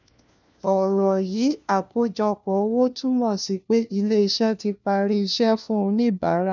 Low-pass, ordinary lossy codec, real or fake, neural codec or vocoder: 7.2 kHz; none; fake; codec, 16 kHz, 1 kbps, FunCodec, trained on LibriTTS, 50 frames a second